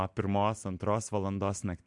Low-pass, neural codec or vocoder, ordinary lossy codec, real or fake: 10.8 kHz; none; MP3, 64 kbps; real